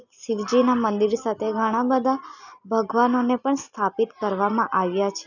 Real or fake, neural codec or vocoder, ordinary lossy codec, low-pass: real; none; none; 7.2 kHz